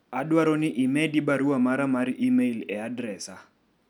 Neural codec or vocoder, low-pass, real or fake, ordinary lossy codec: none; 19.8 kHz; real; none